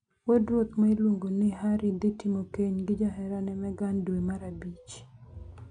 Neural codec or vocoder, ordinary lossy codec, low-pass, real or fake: none; none; 9.9 kHz; real